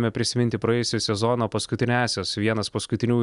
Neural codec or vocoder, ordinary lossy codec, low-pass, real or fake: none; Opus, 64 kbps; 10.8 kHz; real